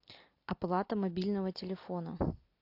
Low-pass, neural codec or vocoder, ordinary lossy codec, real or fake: 5.4 kHz; none; AAC, 32 kbps; real